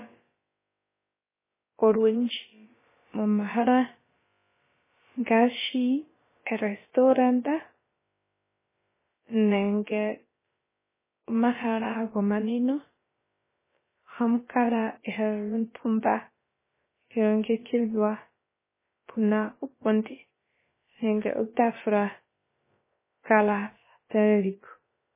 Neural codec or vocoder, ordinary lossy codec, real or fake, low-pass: codec, 16 kHz, about 1 kbps, DyCAST, with the encoder's durations; MP3, 16 kbps; fake; 3.6 kHz